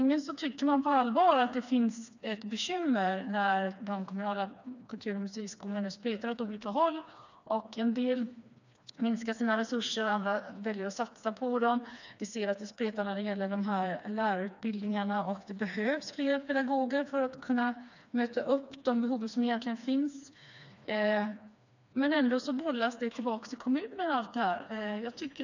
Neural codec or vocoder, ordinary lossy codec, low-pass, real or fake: codec, 16 kHz, 2 kbps, FreqCodec, smaller model; none; 7.2 kHz; fake